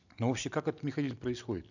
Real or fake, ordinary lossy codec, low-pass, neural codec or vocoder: real; none; 7.2 kHz; none